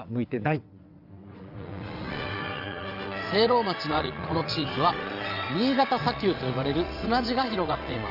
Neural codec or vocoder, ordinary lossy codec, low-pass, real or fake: vocoder, 22.05 kHz, 80 mel bands, WaveNeXt; Opus, 64 kbps; 5.4 kHz; fake